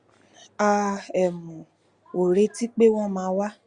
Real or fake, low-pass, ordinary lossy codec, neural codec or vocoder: real; 9.9 kHz; Opus, 64 kbps; none